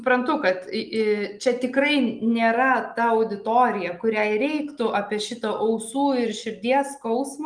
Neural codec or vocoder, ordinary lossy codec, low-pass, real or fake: none; Opus, 32 kbps; 9.9 kHz; real